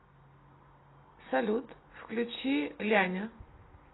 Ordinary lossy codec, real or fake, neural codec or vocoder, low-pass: AAC, 16 kbps; real; none; 7.2 kHz